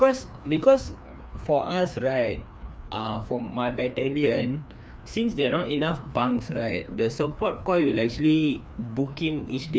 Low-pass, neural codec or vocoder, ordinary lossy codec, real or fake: none; codec, 16 kHz, 2 kbps, FreqCodec, larger model; none; fake